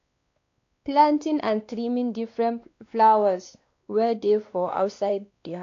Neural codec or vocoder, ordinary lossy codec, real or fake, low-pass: codec, 16 kHz, 2 kbps, X-Codec, WavLM features, trained on Multilingual LibriSpeech; AAC, 48 kbps; fake; 7.2 kHz